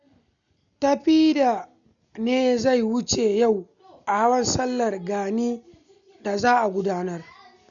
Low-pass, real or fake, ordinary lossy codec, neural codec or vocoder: 7.2 kHz; real; none; none